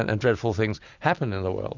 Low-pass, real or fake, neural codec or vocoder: 7.2 kHz; real; none